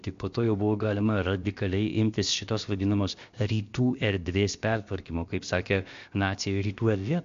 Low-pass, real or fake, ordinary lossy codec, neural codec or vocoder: 7.2 kHz; fake; MP3, 48 kbps; codec, 16 kHz, about 1 kbps, DyCAST, with the encoder's durations